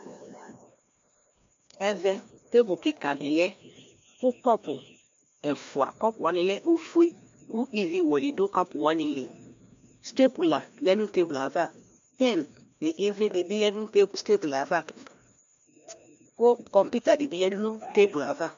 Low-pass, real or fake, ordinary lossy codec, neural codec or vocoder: 7.2 kHz; fake; MP3, 64 kbps; codec, 16 kHz, 1 kbps, FreqCodec, larger model